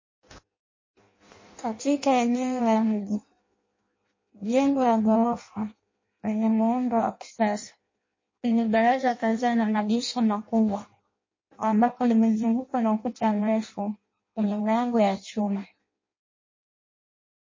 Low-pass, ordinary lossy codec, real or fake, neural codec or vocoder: 7.2 kHz; MP3, 32 kbps; fake; codec, 16 kHz in and 24 kHz out, 0.6 kbps, FireRedTTS-2 codec